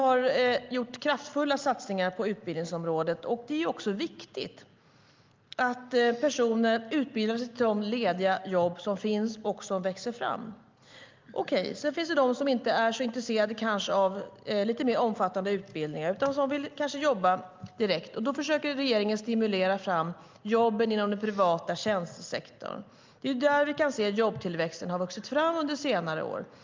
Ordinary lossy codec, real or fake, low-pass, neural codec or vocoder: Opus, 24 kbps; real; 7.2 kHz; none